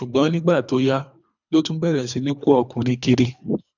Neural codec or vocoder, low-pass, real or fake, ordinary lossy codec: codec, 24 kHz, 3 kbps, HILCodec; 7.2 kHz; fake; none